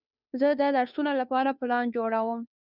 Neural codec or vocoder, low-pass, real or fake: codec, 16 kHz, 2 kbps, FunCodec, trained on Chinese and English, 25 frames a second; 5.4 kHz; fake